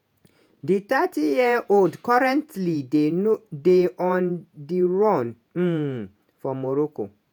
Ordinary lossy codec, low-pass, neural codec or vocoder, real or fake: none; none; vocoder, 48 kHz, 128 mel bands, Vocos; fake